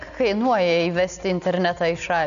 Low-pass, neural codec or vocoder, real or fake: 7.2 kHz; none; real